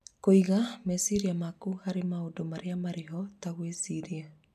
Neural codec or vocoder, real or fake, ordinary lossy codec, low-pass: none; real; none; 14.4 kHz